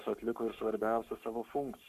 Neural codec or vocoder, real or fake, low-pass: codec, 44.1 kHz, 7.8 kbps, Pupu-Codec; fake; 14.4 kHz